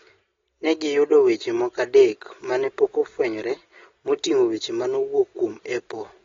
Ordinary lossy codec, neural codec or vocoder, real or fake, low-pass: AAC, 24 kbps; none; real; 7.2 kHz